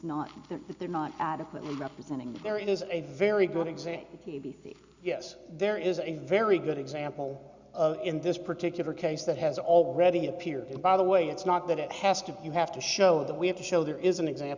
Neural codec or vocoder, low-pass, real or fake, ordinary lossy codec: none; 7.2 kHz; real; Opus, 64 kbps